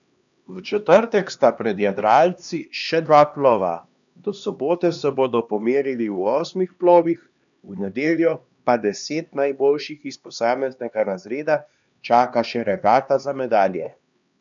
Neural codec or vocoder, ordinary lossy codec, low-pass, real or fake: codec, 16 kHz, 2 kbps, X-Codec, HuBERT features, trained on LibriSpeech; none; 7.2 kHz; fake